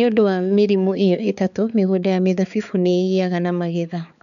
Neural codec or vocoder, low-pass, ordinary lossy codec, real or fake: codec, 16 kHz, 4 kbps, X-Codec, HuBERT features, trained on balanced general audio; 7.2 kHz; none; fake